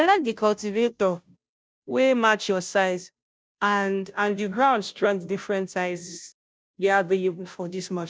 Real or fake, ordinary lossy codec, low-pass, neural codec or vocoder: fake; none; none; codec, 16 kHz, 0.5 kbps, FunCodec, trained on Chinese and English, 25 frames a second